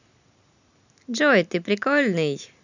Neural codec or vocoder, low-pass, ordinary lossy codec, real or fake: none; 7.2 kHz; none; real